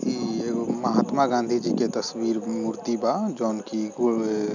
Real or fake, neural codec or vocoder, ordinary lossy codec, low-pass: real; none; none; 7.2 kHz